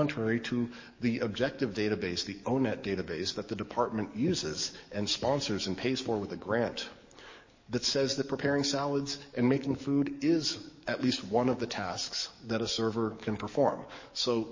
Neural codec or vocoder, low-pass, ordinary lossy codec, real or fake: codec, 44.1 kHz, 7.8 kbps, DAC; 7.2 kHz; MP3, 32 kbps; fake